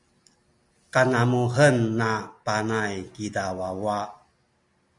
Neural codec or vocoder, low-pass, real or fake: none; 10.8 kHz; real